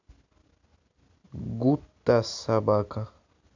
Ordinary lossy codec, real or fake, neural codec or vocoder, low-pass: AAC, 48 kbps; real; none; 7.2 kHz